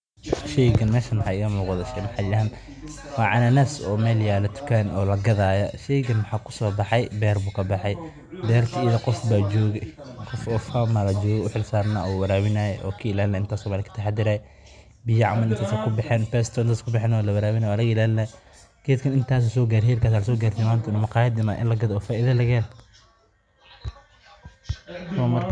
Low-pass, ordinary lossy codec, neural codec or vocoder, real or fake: 9.9 kHz; none; none; real